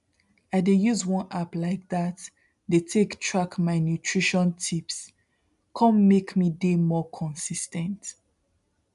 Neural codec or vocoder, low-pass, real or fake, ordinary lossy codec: none; 10.8 kHz; real; none